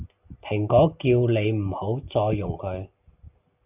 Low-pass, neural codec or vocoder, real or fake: 3.6 kHz; none; real